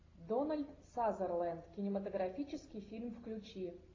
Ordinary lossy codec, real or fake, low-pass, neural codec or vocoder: AAC, 32 kbps; real; 7.2 kHz; none